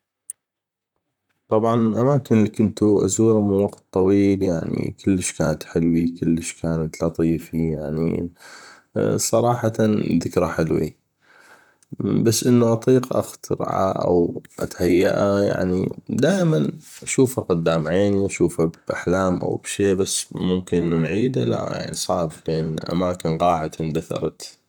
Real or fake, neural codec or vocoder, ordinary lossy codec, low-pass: fake; vocoder, 44.1 kHz, 128 mel bands, Pupu-Vocoder; none; 19.8 kHz